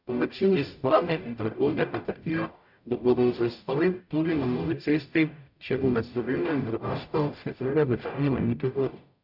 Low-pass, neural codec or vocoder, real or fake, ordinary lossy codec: 5.4 kHz; codec, 44.1 kHz, 0.9 kbps, DAC; fake; none